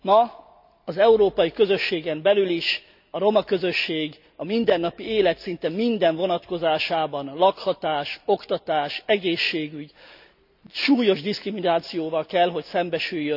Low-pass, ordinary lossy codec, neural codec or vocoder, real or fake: 5.4 kHz; none; none; real